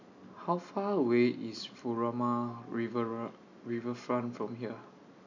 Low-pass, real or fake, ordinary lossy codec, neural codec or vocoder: 7.2 kHz; real; none; none